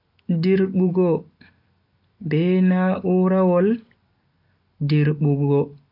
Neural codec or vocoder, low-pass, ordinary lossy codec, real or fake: none; 5.4 kHz; none; real